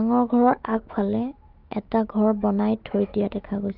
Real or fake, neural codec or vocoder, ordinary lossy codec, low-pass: fake; autoencoder, 48 kHz, 128 numbers a frame, DAC-VAE, trained on Japanese speech; Opus, 32 kbps; 5.4 kHz